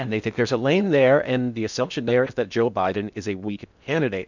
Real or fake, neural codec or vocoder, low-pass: fake; codec, 16 kHz in and 24 kHz out, 0.6 kbps, FocalCodec, streaming, 2048 codes; 7.2 kHz